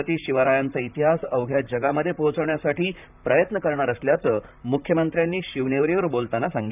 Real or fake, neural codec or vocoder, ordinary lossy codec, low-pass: fake; vocoder, 44.1 kHz, 128 mel bands, Pupu-Vocoder; none; 3.6 kHz